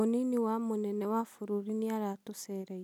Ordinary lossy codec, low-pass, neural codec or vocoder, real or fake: none; 19.8 kHz; none; real